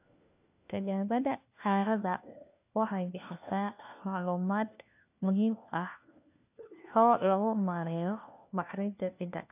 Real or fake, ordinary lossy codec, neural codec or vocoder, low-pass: fake; AAC, 32 kbps; codec, 16 kHz, 1 kbps, FunCodec, trained on LibriTTS, 50 frames a second; 3.6 kHz